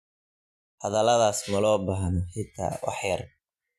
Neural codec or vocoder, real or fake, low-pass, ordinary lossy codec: none; real; 14.4 kHz; AAC, 96 kbps